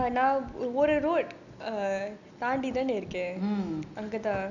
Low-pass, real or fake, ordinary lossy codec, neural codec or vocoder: 7.2 kHz; real; none; none